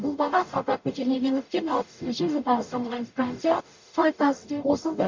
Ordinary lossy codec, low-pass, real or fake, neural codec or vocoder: MP3, 48 kbps; 7.2 kHz; fake; codec, 44.1 kHz, 0.9 kbps, DAC